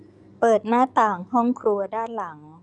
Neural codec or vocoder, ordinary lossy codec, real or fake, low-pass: codec, 44.1 kHz, 7.8 kbps, DAC; none; fake; 10.8 kHz